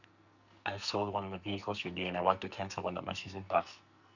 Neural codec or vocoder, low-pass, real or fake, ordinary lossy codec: codec, 44.1 kHz, 2.6 kbps, SNAC; 7.2 kHz; fake; none